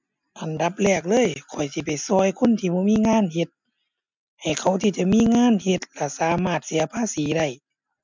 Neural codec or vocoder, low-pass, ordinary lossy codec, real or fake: none; 7.2 kHz; MP3, 64 kbps; real